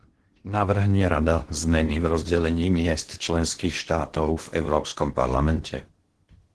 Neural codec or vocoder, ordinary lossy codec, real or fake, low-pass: codec, 16 kHz in and 24 kHz out, 0.8 kbps, FocalCodec, streaming, 65536 codes; Opus, 16 kbps; fake; 10.8 kHz